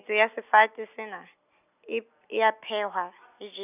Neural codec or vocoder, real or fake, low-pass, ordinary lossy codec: none; real; 3.6 kHz; none